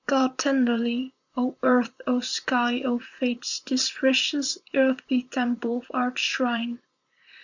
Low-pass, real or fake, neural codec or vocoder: 7.2 kHz; fake; vocoder, 44.1 kHz, 128 mel bands, Pupu-Vocoder